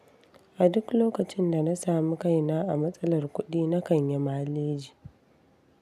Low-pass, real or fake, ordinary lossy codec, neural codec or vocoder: 14.4 kHz; real; none; none